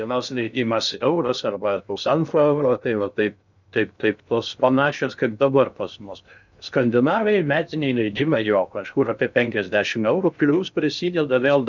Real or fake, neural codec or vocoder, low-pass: fake; codec, 16 kHz in and 24 kHz out, 0.6 kbps, FocalCodec, streaming, 2048 codes; 7.2 kHz